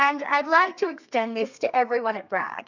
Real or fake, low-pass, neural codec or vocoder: fake; 7.2 kHz; codec, 32 kHz, 1.9 kbps, SNAC